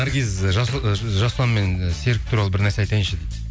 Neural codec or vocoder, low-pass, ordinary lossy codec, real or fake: none; none; none; real